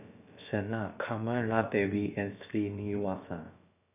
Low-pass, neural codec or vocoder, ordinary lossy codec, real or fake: 3.6 kHz; codec, 16 kHz, about 1 kbps, DyCAST, with the encoder's durations; none; fake